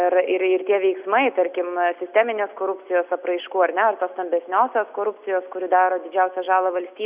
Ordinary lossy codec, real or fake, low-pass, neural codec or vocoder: Opus, 64 kbps; real; 3.6 kHz; none